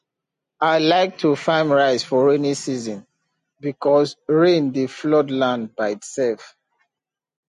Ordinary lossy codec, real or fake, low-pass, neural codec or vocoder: MP3, 48 kbps; real; 14.4 kHz; none